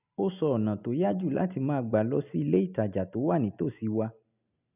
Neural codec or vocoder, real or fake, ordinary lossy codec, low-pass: none; real; none; 3.6 kHz